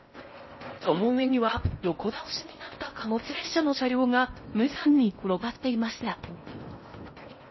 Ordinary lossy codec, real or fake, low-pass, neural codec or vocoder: MP3, 24 kbps; fake; 7.2 kHz; codec, 16 kHz in and 24 kHz out, 0.6 kbps, FocalCodec, streaming, 4096 codes